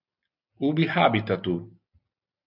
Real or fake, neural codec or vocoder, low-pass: real; none; 5.4 kHz